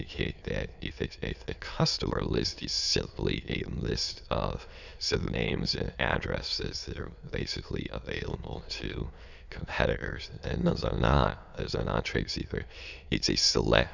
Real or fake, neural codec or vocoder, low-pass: fake; autoencoder, 22.05 kHz, a latent of 192 numbers a frame, VITS, trained on many speakers; 7.2 kHz